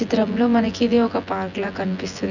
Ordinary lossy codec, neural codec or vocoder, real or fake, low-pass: none; vocoder, 24 kHz, 100 mel bands, Vocos; fake; 7.2 kHz